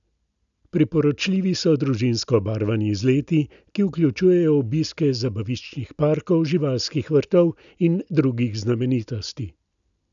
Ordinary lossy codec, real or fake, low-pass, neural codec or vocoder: none; real; 7.2 kHz; none